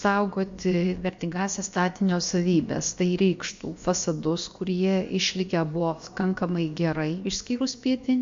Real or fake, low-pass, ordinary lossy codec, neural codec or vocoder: fake; 7.2 kHz; MP3, 48 kbps; codec, 16 kHz, about 1 kbps, DyCAST, with the encoder's durations